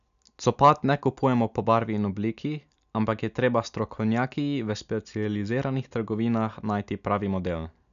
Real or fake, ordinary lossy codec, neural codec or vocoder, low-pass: real; none; none; 7.2 kHz